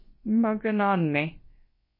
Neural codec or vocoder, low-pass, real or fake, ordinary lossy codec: codec, 16 kHz, about 1 kbps, DyCAST, with the encoder's durations; 5.4 kHz; fake; MP3, 24 kbps